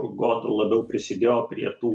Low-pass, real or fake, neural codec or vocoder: 10.8 kHz; real; none